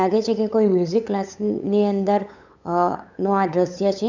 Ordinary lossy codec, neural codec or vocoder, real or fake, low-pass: none; codec, 16 kHz, 8 kbps, FunCodec, trained on LibriTTS, 25 frames a second; fake; 7.2 kHz